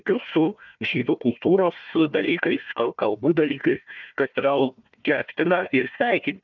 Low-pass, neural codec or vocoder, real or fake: 7.2 kHz; codec, 16 kHz, 1 kbps, FunCodec, trained on Chinese and English, 50 frames a second; fake